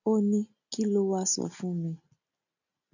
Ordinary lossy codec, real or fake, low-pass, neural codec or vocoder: none; real; 7.2 kHz; none